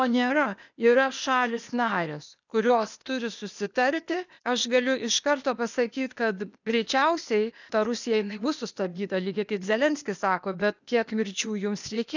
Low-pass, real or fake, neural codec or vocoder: 7.2 kHz; fake; codec, 16 kHz, 0.8 kbps, ZipCodec